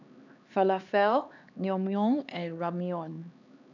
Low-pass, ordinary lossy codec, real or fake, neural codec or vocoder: 7.2 kHz; none; fake; codec, 16 kHz, 2 kbps, X-Codec, HuBERT features, trained on LibriSpeech